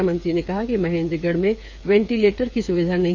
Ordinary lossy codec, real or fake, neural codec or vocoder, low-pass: AAC, 48 kbps; fake; codec, 16 kHz, 8 kbps, FreqCodec, smaller model; 7.2 kHz